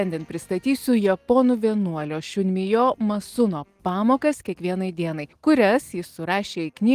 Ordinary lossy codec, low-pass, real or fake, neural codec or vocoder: Opus, 24 kbps; 14.4 kHz; real; none